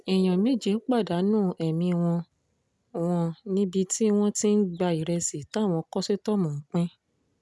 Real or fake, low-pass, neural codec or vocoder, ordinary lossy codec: real; none; none; none